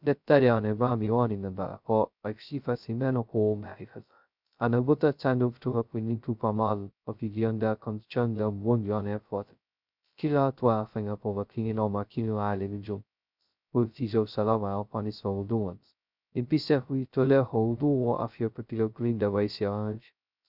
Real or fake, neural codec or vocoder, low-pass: fake; codec, 16 kHz, 0.2 kbps, FocalCodec; 5.4 kHz